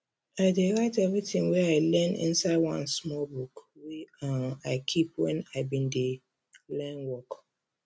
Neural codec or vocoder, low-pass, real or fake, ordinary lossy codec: none; none; real; none